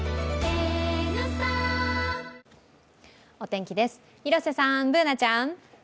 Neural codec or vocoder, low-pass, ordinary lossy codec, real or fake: none; none; none; real